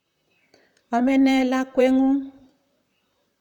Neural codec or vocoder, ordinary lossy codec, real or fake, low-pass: vocoder, 44.1 kHz, 128 mel bands, Pupu-Vocoder; none; fake; 19.8 kHz